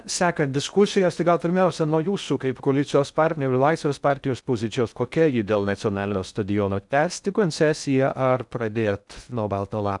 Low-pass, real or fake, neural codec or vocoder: 10.8 kHz; fake; codec, 16 kHz in and 24 kHz out, 0.6 kbps, FocalCodec, streaming, 2048 codes